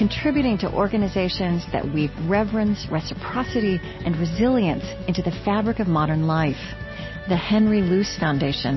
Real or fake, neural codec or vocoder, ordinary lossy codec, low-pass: real; none; MP3, 24 kbps; 7.2 kHz